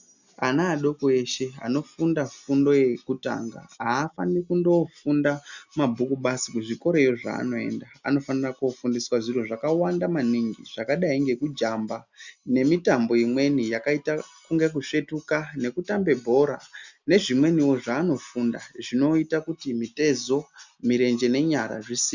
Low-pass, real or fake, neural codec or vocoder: 7.2 kHz; real; none